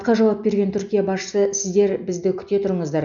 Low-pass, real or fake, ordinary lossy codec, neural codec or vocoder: 7.2 kHz; real; none; none